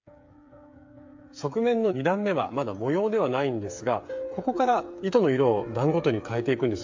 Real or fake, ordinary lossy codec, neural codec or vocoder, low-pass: fake; MP3, 48 kbps; codec, 16 kHz, 8 kbps, FreqCodec, smaller model; 7.2 kHz